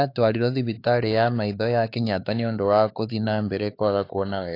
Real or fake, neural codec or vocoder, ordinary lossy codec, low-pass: fake; codec, 16 kHz, 4 kbps, X-Codec, HuBERT features, trained on LibriSpeech; AAC, 32 kbps; 5.4 kHz